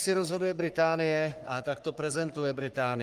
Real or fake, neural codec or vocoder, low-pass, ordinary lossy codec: fake; codec, 44.1 kHz, 3.4 kbps, Pupu-Codec; 14.4 kHz; Opus, 32 kbps